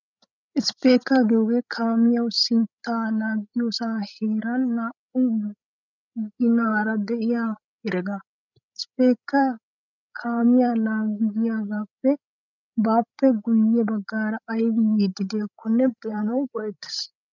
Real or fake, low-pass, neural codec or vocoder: fake; 7.2 kHz; codec, 16 kHz, 16 kbps, FreqCodec, larger model